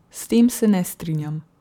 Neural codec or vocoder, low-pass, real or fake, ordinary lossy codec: vocoder, 44.1 kHz, 128 mel bands every 512 samples, BigVGAN v2; 19.8 kHz; fake; none